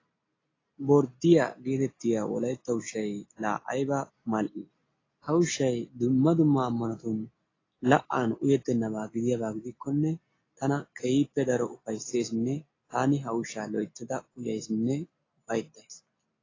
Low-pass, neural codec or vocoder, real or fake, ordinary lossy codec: 7.2 kHz; none; real; AAC, 32 kbps